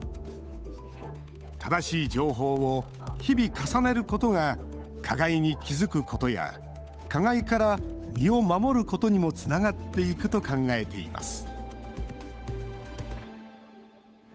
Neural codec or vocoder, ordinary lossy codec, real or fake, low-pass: codec, 16 kHz, 8 kbps, FunCodec, trained on Chinese and English, 25 frames a second; none; fake; none